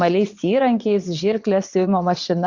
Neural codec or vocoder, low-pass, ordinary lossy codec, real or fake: vocoder, 22.05 kHz, 80 mel bands, WaveNeXt; 7.2 kHz; Opus, 64 kbps; fake